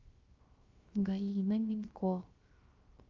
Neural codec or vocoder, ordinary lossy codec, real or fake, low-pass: codec, 16 kHz, 0.3 kbps, FocalCodec; Opus, 32 kbps; fake; 7.2 kHz